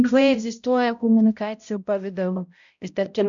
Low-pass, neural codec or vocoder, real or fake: 7.2 kHz; codec, 16 kHz, 0.5 kbps, X-Codec, HuBERT features, trained on balanced general audio; fake